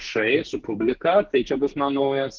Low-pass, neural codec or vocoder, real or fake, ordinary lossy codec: 7.2 kHz; codec, 44.1 kHz, 2.6 kbps, SNAC; fake; Opus, 16 kbps